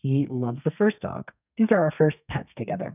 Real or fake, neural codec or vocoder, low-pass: fake; codec, 32 kHz, 1.9 kbps, SNAC; 3.6 kHz